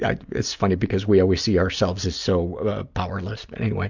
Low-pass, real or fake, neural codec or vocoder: 7.2 kHz; real; none